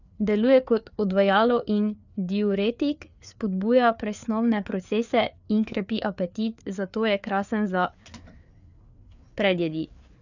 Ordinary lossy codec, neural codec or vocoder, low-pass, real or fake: none; codec, 16 kHz, 4 kbps, FreqCodec, larger model; 7.2 kHz; fake